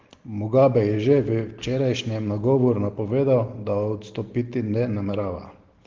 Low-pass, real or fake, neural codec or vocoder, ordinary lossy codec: 7.2 kHz; real; none; Opus, 16 kbps